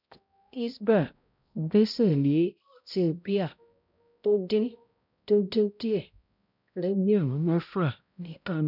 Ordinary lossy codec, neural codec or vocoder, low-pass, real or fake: none; codec, 16 kHz, 0.5 kbps, X-Codec, HuBERT features, trained on balanced general audio; 5.4 kHz; fake